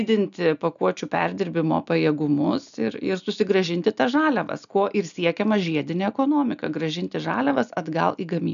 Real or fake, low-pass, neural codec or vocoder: real; 7.2 kHz; none